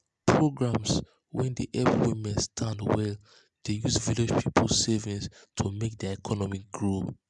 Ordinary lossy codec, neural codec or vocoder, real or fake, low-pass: MP3, 96 kbps; none; real; 10.8 kHz